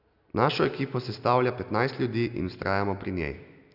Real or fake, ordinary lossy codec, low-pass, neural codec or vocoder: real; none; 5.4 kHz; none